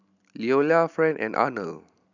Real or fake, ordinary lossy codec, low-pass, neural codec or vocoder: real; none; 7.2 kHz; none